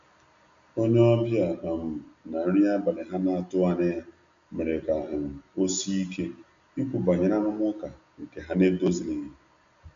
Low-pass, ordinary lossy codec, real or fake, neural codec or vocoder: 7.2 kHz; MP3, 64 kbps; real; none